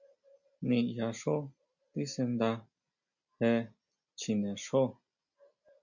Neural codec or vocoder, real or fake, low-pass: none; real; 7.2 kHz